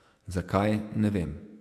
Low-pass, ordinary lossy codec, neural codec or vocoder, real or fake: 14.4 kHz; AAC, 64 kbps; autoencoder, 48 kHz, 128 numbers a frame, DAC-VAE, trained on Japanese speech; fake